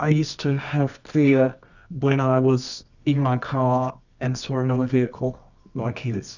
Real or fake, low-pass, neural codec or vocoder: fake; 7.2 kHz; codec, 24 kHz, 0.9 kbps, WavTokenizer, medium music audio release